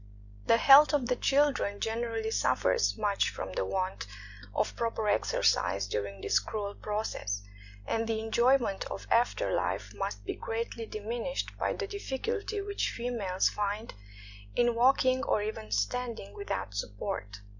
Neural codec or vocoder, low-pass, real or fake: none; 7.2 kHz; real